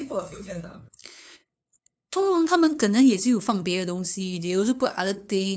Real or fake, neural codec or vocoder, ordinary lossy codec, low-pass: fake; codec, 16 kHz, 2 kbps, FunCodec, trained on LibriTTS, 25 frames a second; none; none